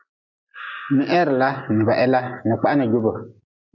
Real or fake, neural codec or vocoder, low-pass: real; none; 7.2 kHz